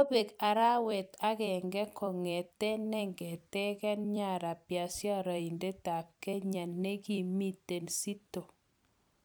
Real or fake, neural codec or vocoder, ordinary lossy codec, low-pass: fake; vocoder, 44.1 kHz, 128 mel bands every 256 samples, BigVGAN v2; none; none